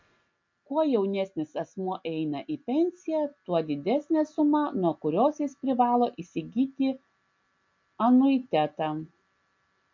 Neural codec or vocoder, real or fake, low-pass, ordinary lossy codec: none; real; 7.2 kHz; MP3, 64 kbps